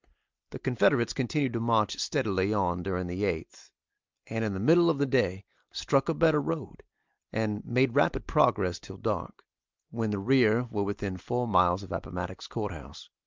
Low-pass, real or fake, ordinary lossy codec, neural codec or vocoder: 7.2 kHz; real; Opus, 24 kbps; none